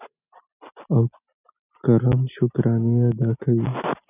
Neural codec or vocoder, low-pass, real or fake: none; 3.6 kHz; real